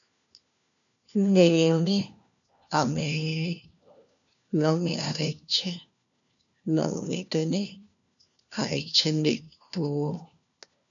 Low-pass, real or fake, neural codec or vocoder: 7.2 kHz; fake; codec, 16 kHz, 1 kbps, FunCodec, trained on LibriTTS, 50 frames a second